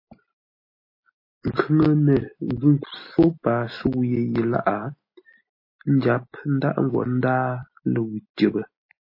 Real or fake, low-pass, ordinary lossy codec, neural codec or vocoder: real; 5.4 kHz; MP3, 24 kbps; none